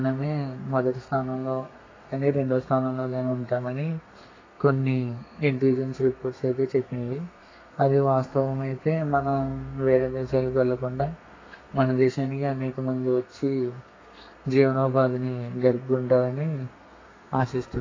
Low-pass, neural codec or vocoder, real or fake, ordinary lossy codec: 7.2 kHz; codec, 32 kHz, 1.9 kbps, SNAC; fake; AAC, 32 kbps